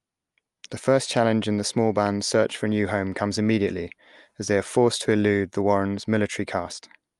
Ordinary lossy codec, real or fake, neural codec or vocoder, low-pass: Opus, 32 kbps; real; none; 10.8 kHz